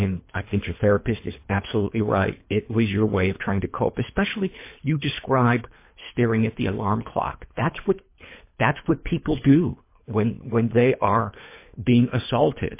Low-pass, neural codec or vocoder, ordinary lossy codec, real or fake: 3.6 kHz; codec, 24 kHz, 3 kbps, HILCodec; MP3, 24 kbps; fake